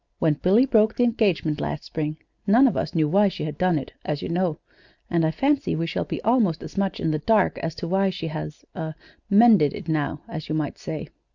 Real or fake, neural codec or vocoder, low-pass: real; none; 7.2 kHz